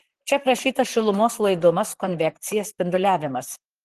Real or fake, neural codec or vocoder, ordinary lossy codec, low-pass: fake; vocoder, 44.1 kHz, 128 mel bands, Pupu-Vocoder; Opus, 16 kbps; 14.4 kHz